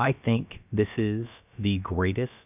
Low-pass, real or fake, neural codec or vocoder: 3.6 kHz; fake; codec, 16 kHz, about 1 kbps, DyCAST, with the encoder's durations